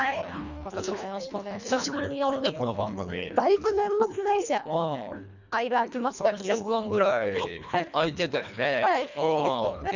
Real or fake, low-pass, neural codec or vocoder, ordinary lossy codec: fake; 7.2 kHz; codec, 24 kHz, 1.5 kbps, HILCodec; none